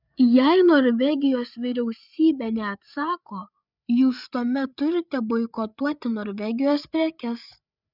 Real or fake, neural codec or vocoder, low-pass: fake; codec, 16 kHz, 8 kbps, FreqCodec, larger model; 5.4 kHz